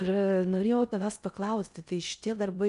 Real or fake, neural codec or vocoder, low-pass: fake; codec, 16 kHz in and 24 kHz out, 0.6 kbps, FocalCodec, streaming, 4096 codes; 10.8 kHz